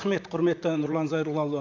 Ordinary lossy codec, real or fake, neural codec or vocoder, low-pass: none; fake; vocoder, 44.1 kHz, 128 mel bands, Pupu-Vocoder; 7.2 kHz